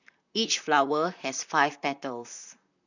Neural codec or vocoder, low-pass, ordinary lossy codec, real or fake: vocoder, 44.1 kHz, 128 mel bands, Pupu-Vocoder; 7.2 kHz; none; fake